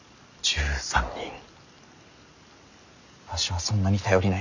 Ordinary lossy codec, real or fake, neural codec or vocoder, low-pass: none; real; none; 7.2 kHz